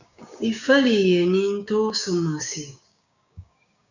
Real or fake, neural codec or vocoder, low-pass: fake; codec, 16 kHz, 6 kbps, DAC; 7.2 kHz